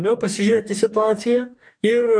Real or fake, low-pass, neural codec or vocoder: fake; 9.9 kHz; codec, 44.1 kHz, 2.6 kbps, DAC